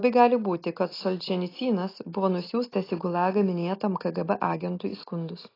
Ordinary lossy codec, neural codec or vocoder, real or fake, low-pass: AAC, 24 kbps; none; real; 5.4 kHz